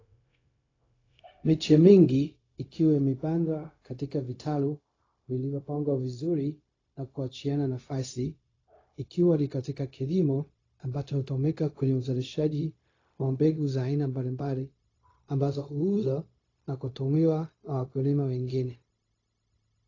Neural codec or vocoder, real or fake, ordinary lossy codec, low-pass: codec, 16 kHz, 0.4 kbps, LongCat-Audio-Codec; fake; AAC, 32 kbps; 7.2 kHz